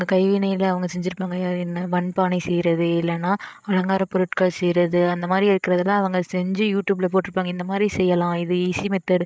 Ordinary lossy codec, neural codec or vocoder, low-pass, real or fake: none; codec, 16 kHz, 8 kbps, FreqCodec, larger model; none; fake